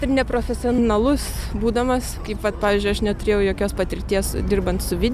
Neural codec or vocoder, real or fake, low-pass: none; real; 14.4 kHz